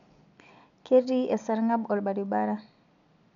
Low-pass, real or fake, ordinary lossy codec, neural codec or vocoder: 7.2 kHz; real; none; none